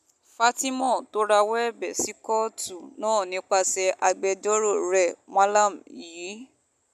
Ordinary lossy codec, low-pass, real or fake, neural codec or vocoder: none; 14.4 kHz; real; none